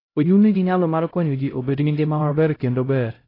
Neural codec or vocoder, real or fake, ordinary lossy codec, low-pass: codec, 16 kHz, 0.5 kbps, X-Codec, HuBERT features, trained on LibriSpeech; fake; AAC, 24 kbps; 5.4 kHz